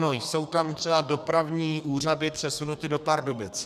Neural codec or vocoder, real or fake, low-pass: codec, 32 kHz, 1.9 kbps, SNAC; fake; 14.4 kHz